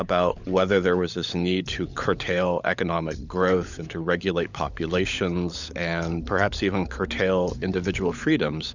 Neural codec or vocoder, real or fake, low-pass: codec, 16 kHz, 16 kbps, FunCodec, trained on LibriTTS, 50 frames a second; fake; 7.2 kHz